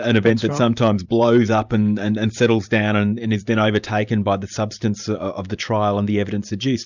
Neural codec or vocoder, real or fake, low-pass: vocoder, 44.1 kHz, 128 mel bands every 512 samples, BigVGAN v2; fake; 7.2 kHz